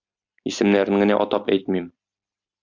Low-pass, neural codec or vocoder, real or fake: 7.2 kHz; none; real